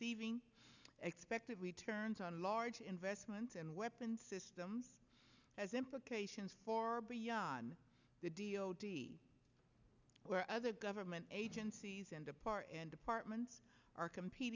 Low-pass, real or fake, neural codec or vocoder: 7.2 kHz; real; none